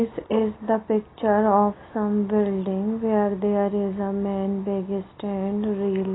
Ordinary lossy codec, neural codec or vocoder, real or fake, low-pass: AAC, 16 kbps; none; real; 7.2 kHz